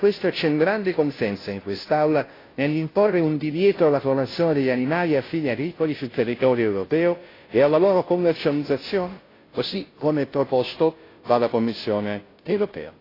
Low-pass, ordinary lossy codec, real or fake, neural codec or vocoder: 5.4 kHz; AAC, 24 kbps; fake; codec, 16 kHz, 0.5 kbps, FunCodec, trained on Chinese and English, 25 frames a second